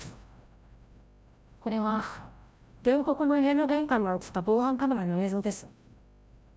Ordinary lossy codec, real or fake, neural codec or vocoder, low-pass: none; fake; codec, 16 kHz, 0.5 kbps, FreqCodec, larger model; none